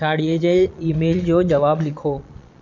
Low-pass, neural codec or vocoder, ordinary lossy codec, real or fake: 7.2 kHz; vocoder, 22.05 kHz, 80 mel bands, Vocos; none; fake